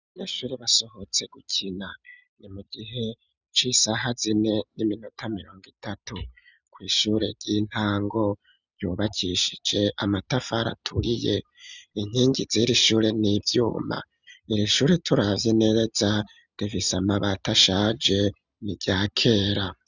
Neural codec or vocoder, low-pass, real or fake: none; 7.2 kHz; real